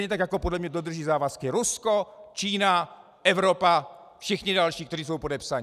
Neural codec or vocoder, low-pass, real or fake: none; 14.4 kHz; real